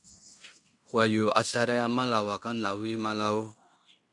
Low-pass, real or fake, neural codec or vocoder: 10.8 kHz; fake; codec, 16 kHz in and 24 kHz out, 0.9 kbps, LongCat-Audio-Codec, fine tuned four codebook decoder